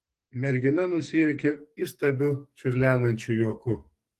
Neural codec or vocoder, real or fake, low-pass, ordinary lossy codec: codec, 44.1 kHz, 2.6 kbps, SNAC; fake; 14.4 kHz; Opus, 24 kbps